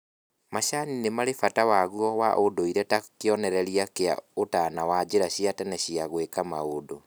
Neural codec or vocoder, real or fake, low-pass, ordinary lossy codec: none; real; none; none